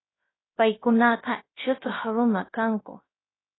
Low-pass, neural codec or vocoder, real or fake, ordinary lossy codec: 7.2 kHz; codec, 16 kHz, 0.3 kbps, FocalCodec; fake; AAC, 16 kbps